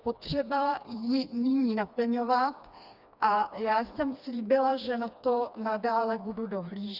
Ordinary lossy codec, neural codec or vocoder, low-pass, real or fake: Opus, 64 kbps; codec, 16 kHz, 2 kbps, FreqCodec, smaller model; 5.4 kHz; fake